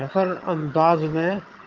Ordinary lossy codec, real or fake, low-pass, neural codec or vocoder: Opus, 32 kbps; fake; 7.2 kHz; vocoder, 22.05 kHz, 80 mel bands, HiFi-GAN